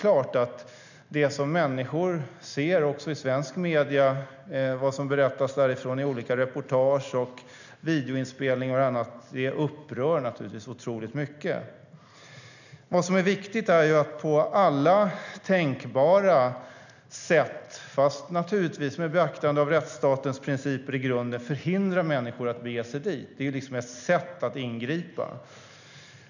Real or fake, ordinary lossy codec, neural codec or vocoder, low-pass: real; none; none; 7.2 kHz